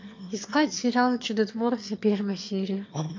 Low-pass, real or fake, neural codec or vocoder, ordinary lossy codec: 7.2 kHz; fake; autoencoder, 22.05 kHz, a latent of 192 numbers a frame, VITS, trained on one speaker; MP3, 48 kbps